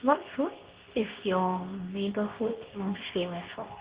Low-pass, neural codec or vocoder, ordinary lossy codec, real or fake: 3.6 kHz; codec, 24 kHz, 0.9 kbps, WavTokenizer, medium speech release version 2; Opus, 16 kbps; fake